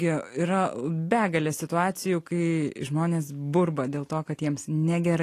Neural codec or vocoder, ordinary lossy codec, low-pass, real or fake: none; AAC, 48 kbps; 14.4 kHz; real